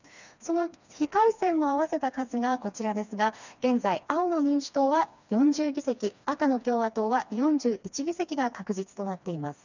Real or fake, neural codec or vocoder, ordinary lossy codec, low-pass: fake; codec, 16 kHz, 2 kbps, FreqCodec, smaller model; none; 7.2 kHz